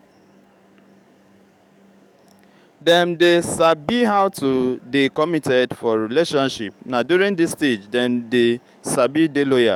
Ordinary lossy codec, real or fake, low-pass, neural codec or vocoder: none; fake; 19.8 kHz; codec, 44.1 kHz, 7.8 kbps, DAC